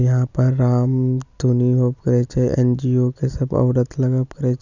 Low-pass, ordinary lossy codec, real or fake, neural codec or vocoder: 7.2 kHz; none; real; none